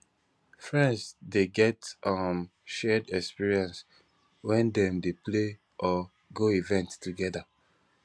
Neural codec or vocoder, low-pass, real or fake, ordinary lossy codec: none; none; real; none